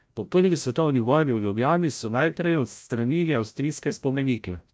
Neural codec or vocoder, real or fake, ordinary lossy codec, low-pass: codec, 16 kHz, 0.5 kbps, FreqCodec, larger model; fake; none; none